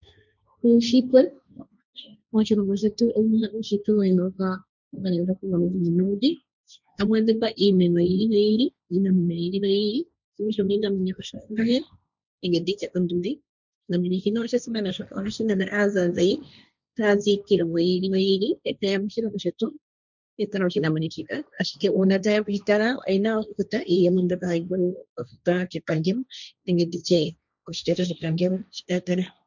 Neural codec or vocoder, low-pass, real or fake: codec, 16 kHz, 1.1 kbps, Voila-Tokenizer; 7.2 kHz; fake